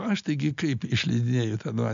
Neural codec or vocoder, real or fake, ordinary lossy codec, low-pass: none; real; MP3, 64 kbps; 7.2 kHz